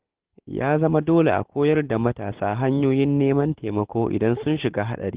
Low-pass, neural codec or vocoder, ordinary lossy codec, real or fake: 3.6 kHz; none; Opus, 16 kbps; real